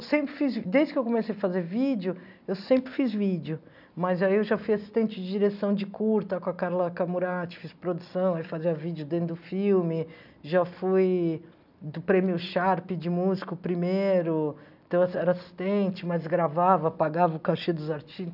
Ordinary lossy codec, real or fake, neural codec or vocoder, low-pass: none; real; none; 5.4 kHz